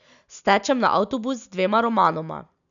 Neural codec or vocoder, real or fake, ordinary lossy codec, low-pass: none; real; none; 7.2 kHz